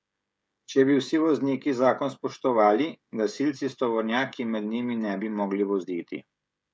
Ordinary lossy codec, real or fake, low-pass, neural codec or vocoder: none; fake; none; codec, 16 kHz, 16 kbps, FreqCodec, smaller model